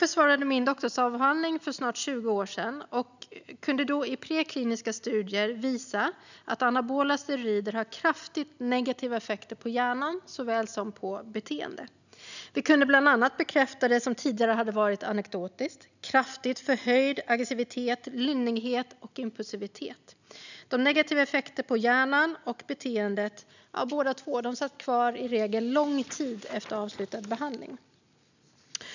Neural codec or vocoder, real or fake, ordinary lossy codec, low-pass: none; real; none; 7.2 kHz